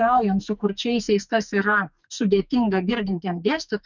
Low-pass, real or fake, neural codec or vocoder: 7.2 kHz; fake; codec, 44.1 kHz, 2.6 kbps, SNAC